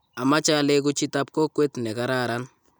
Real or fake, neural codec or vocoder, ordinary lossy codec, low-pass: real; none; none; none